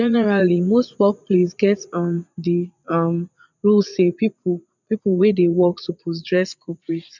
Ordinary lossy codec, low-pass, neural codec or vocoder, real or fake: none; 7.2 kHz; vocoder, 44.1 kHz, 80 mel bands, Vocos; fake